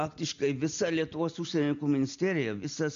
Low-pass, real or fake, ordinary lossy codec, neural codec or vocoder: 7.2 kHz; real; MP3, 64 kbps; none